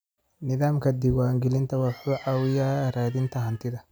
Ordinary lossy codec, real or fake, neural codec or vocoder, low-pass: none; real; none; none